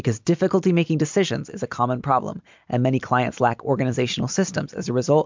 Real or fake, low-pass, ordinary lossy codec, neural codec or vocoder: real; 7.2 kHz; MP3, 64 kbps; none